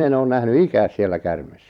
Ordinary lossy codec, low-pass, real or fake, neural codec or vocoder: none; 19.8 kHz; fake; vocoder, 44.1 kHz, 128 mel bands every 256 samples, BigVGAN v2